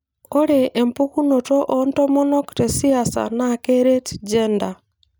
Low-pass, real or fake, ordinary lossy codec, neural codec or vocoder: none; real; none; none